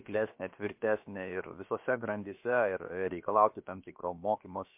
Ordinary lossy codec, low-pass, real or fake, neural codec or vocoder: MP3, 32 kbps; 3.6 kHz; fake; codec, 16 kHz, about 1 kbps, DyCAST, with the encoder's durations